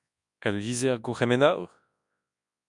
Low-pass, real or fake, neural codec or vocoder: 10.8 kHz; fake; codec, 24 kHz, 0.9 kbps, WavTokenizer, large speech release